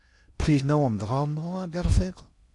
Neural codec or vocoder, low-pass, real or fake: codec, 16 kHz in and 24 kHz out, 0.6 kbps, FocalCodec, streaming, 2048 codes; 10.8 kHz; fake